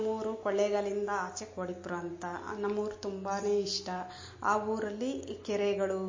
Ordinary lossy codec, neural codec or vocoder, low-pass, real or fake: MP3, 32 kbps; none; 7.2 kHz; real